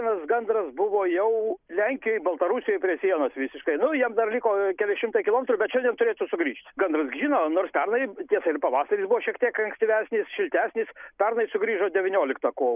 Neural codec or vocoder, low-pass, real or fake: none; 3.6 kHz; real